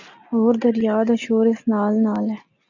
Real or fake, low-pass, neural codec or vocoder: real; 7.2 kHz; none